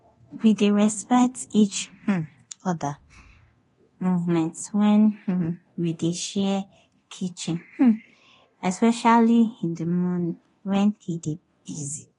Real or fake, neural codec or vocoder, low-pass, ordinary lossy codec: fake; codec, 24 kHz, 0.9 kbps, DualCodec; 10.8 kHz; AAC, 32 kbps